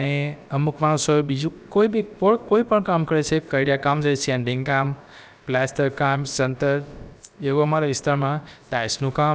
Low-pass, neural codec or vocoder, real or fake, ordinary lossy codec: none; codec, 16 kHz, about 1 kbps, DyCAST, with the encoder's durations; fake; none